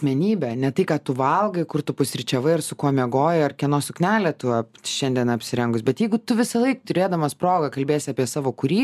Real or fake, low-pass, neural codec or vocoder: real; 14.4 kHz; none